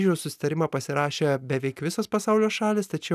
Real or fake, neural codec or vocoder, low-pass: real; none; 14.4 kHz